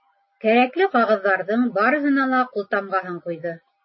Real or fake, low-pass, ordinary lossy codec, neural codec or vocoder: real; 7.2 kHz; MP3, 24 kbps; none